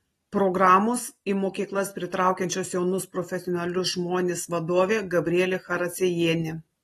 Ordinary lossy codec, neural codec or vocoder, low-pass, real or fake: AAC, 32 kbps; none; 19.8 kHz; real